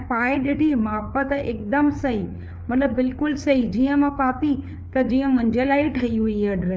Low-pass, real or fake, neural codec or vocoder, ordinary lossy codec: none; fake; codec, 16 kHz, 4 kbps, FreqCodec, larger model; none